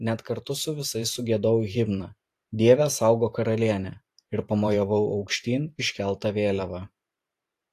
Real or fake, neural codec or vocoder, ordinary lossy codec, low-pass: fake; vocoder, 44.1 kHz, 128 mel bands every 512 samples, BigVGAN v2; AAC, 64 kbps; 14.4 kHz